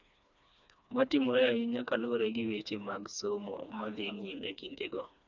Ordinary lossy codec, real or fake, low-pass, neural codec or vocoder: none; fake; 7.2 kHz; codec, 16 kHz, 2 kbps, FreqCodec, smaller model